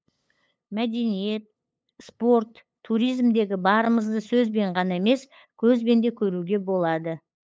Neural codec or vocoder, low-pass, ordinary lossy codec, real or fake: codec, 16 kHz, 8 kbps, FunCodec, trained on LibriTTS, 25 frames a second; none; none; fake